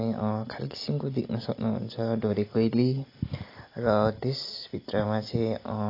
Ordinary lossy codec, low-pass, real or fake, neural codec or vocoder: AAC, 32 kbps; 5.4 kHz; real; none